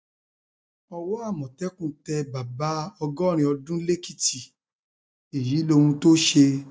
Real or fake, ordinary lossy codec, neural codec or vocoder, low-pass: real; none; none; none